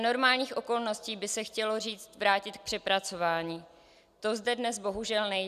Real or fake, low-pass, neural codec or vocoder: real; 14.4 kHz; none